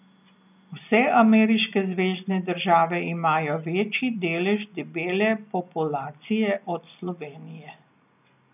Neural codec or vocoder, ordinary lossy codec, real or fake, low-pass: none; none; real; 3.6 kHz